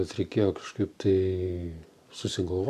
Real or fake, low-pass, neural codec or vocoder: real; 14.4 kHz; none